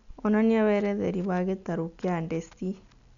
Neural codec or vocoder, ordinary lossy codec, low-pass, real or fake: none; none; 7.2 kHz; real